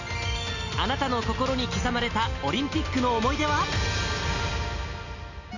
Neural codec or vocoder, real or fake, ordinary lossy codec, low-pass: none; real; none; 7.2 kHz